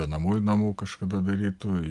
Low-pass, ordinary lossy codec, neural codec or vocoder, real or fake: 10.8 kHz; Opus, 24 kbps; none; real